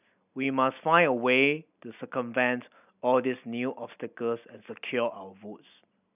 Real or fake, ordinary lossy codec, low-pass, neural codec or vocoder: real; none; 3.6 kHz; none